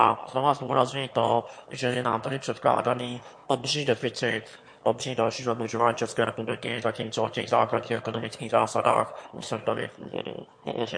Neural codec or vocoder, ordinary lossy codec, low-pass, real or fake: autoencoder, 22.05 kHz, a latent of 192 numbers a frame, VITS, trained on one speaker; MP3, 48 kbps; 9.9 kHz; fake